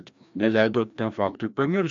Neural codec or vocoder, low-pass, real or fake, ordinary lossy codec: codec, 16 kHz, 1 kbps, FreqCodec, larger model; 7.2 kHz; fake; MP3, 64 kbps